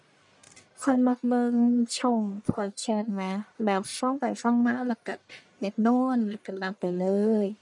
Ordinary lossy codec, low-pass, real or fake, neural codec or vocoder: none; 10.8 kHz; fake; codec, 44.1 kHz, 1.7 kbps, Pupu-Codec